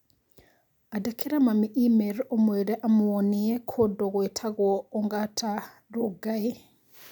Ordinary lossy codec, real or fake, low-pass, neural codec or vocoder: none; real; 19.8 kHz; none